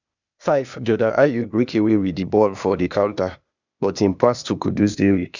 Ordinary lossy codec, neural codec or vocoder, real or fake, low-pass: none; codec, 16 kHz, 0.8 kbps, ZipCodec; fake; 7.2 kHz